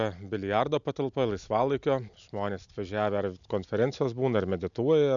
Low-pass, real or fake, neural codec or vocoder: 7.2 kHz; real; none